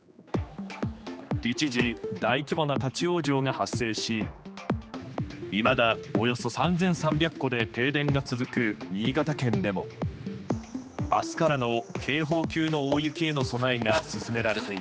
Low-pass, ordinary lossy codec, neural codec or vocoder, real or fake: none; none; codec, 16 kHz, 2 kbps, X-Codec, HuBERT features, trained on general audio; fake